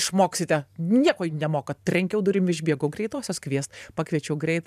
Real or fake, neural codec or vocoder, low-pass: fake; vocoder, 44.1 kHz, 128 mel bands every 512 samples, BigVGAN v2; 14.4 kHz